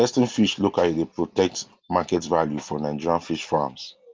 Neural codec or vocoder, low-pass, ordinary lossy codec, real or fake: none; 7.2 kHz; Opus, 24 kbps; real